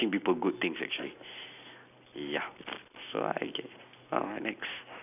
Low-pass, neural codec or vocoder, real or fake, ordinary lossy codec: 3.6 kHz; none; real; none